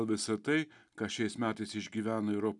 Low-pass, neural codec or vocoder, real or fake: 10.8 kHz; none; real